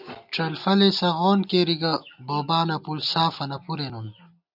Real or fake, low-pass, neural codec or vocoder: real; 5.4 kHz; none